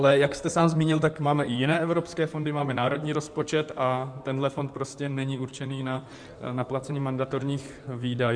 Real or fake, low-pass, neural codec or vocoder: fake; 9.9 kHz; codec, 16 kHz in and 24 kHz out, 2.2 kbps, FireRedTTS-2 codec